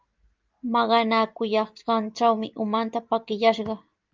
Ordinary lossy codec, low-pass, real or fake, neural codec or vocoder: Opus, 24 kbps; 7.2 kHz; real; none